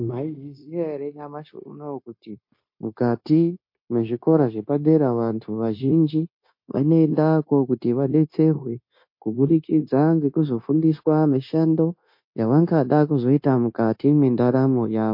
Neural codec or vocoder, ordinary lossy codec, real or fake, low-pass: codec, 16 kHz, 0.9 kbps, LongCat-Audio-Codec; MP3, 32 kbps; fake; 5.4 kHz